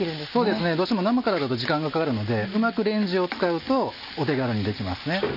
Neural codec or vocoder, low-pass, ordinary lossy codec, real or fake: none; 5.4 kHz; none; real